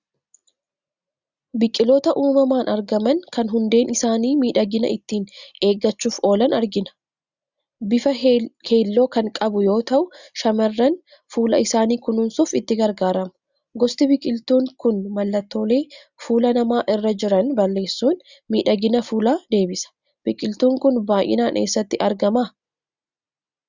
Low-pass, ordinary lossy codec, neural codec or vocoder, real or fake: 7.2 kHz; Opus, 64 kbps; none; real